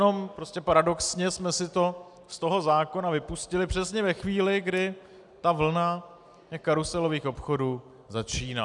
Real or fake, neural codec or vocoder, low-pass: real; none; 10.8 kHz